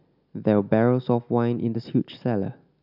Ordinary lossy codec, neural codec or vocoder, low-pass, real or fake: none; none; 5.4 kHz; real